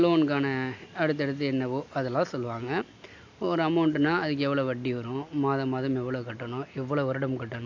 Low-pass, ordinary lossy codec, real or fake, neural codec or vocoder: 7.2 kHz; AAC, 48 kbps; real; none